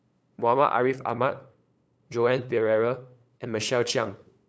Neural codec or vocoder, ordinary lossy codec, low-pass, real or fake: codec, 16 kHz, 8 kbps, FunCodec, trained on LibriTTS, 25 frames a second; none; none; fake